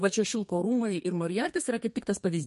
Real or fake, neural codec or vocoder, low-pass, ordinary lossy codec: fake; codec, 32 kHz, 1.9 kbps, SNAC; 14.4 kHz; MP3, 48 kbps